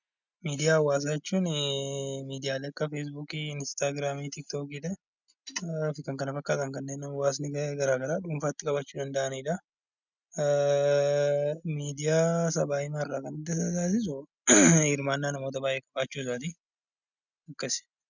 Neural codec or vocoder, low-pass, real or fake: none; 7.2 kHz; real